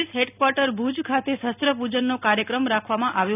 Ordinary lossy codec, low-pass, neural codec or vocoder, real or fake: none; 3.6 kHz; none; real